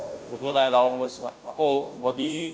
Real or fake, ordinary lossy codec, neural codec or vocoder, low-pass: fake; none; codec, 16 kHz, 0.5 kbps, FunCodec, trained on Chinese and English, 25 frames a second; none